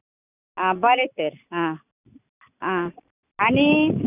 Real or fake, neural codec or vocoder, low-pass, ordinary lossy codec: real; none; 3.6 kHz; none